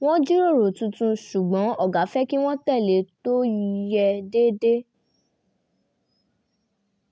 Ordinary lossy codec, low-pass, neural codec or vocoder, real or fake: none; none; none; real